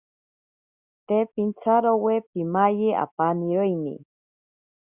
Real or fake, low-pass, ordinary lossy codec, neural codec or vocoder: real; 3.6 kHz; Opus, 64 kbps; none